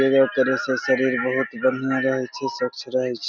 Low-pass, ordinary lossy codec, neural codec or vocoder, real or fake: 7.2 kHz; none; none; real